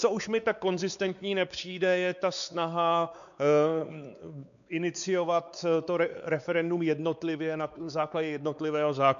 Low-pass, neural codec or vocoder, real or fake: 7.2 kHz; codec, 16 kHz, 4 kbps, X-Codec, WavLM features, trained on Multilingual LibriSpeech; fake